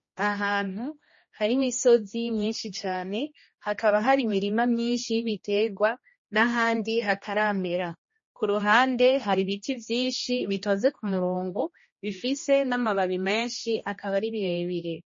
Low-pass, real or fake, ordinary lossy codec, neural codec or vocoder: 7.2 kHz; fake; MP3, 32 kbps; codec, 16 kHz, 1 kbps, X-Codec, HuBERT features, trained on general audio